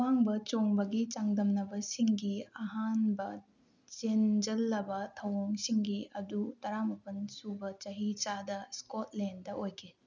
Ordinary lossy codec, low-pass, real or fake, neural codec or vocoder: none; 7.2 kHz; real; none